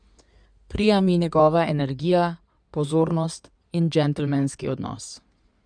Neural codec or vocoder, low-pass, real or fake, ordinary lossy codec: codec, 16 kHz in and 24 kHz out, 2.2 kbps, FireRedTTS-2 codec; 9.9 kHz; fake; none